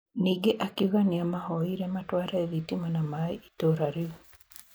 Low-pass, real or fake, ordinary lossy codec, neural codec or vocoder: none; real; none; none